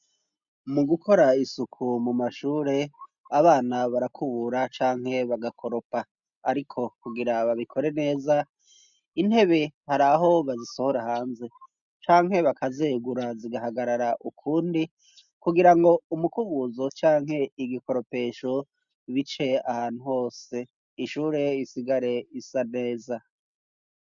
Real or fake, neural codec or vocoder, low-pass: real; none; 7.2 kHz